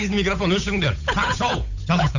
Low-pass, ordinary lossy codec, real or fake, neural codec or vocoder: 7.2 kHz; none; fake; codec, 16 kHz, 8 kbps, FunCodec, trained on Chinese and English, 25 frames a second